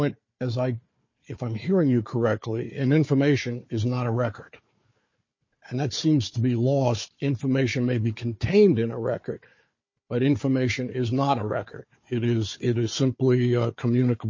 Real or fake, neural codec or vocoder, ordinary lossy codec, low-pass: fake; codec, 16 kHz, 4 kbps, FunCodec, trained on Chinese and English, 50 frames a second; MP3, 32 kbps; 7.2 kHz